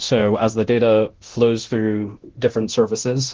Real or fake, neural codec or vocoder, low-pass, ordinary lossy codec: fake; codec, 24 kHz, 0.9 kbps, DualCodec; 7.2 kHz; Opus, 16 kbps